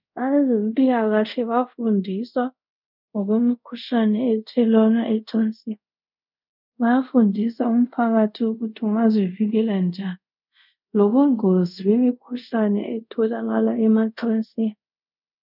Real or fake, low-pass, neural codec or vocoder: fake; 5.4 kHz; codec, 24 kHz, 0.5 kbps, DualCodec